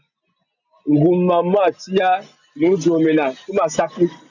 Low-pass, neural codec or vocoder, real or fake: 7.2 kHz; none; real